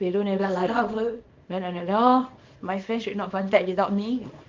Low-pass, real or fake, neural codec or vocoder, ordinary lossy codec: 7.2 kHz; fake; codec, 24 kHz, 0.9 kbps, WavTokenizer, small release; Opus, 24 kbps